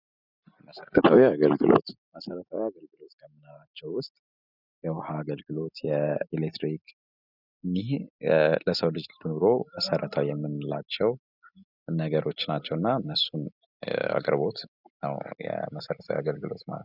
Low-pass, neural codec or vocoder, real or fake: 5.4 kHz; none; real